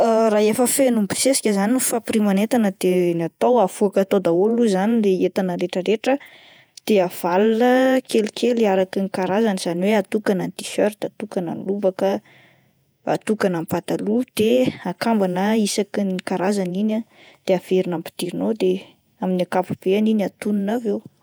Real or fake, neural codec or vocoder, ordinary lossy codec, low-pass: fake; vocoder, 48 kHz, 128 mel bands, Vocos; none; none